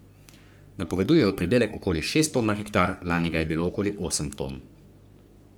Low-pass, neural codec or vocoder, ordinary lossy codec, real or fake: none; codec, 44.1 kHz, 3.4 kbps, Pupu-Codec; none; fake